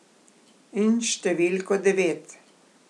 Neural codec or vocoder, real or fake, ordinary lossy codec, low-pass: none; real; none; none